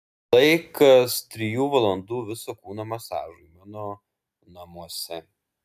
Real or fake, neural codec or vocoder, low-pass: real; none; 14.4 kHz